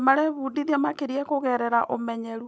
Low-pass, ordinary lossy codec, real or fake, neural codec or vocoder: none; none; real; none